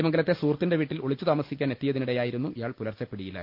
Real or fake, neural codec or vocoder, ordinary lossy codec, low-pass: real; none; Opus, 24 kbps; 5.4 kHz